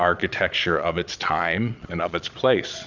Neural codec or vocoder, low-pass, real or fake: vocoder, 22.05 kHz, 80 mel bands, Vocos; 7.2 kHz; fake